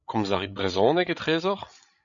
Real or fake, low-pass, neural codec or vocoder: fake; 7.2 kHz; codec, 16 kHz, 8 kbps, FreqCodec, larger model